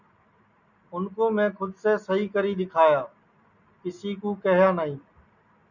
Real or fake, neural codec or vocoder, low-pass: real; none; 7.2 kHz